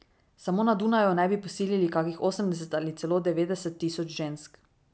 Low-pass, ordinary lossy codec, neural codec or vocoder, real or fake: none; none; none; real